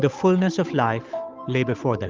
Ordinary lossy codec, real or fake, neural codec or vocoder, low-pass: Opus, 24 kbps; fake; codec, 16 kHz, 8 kbps, FunCodec, trained on Chinese and English, 25 frames a second; 7.2 kHz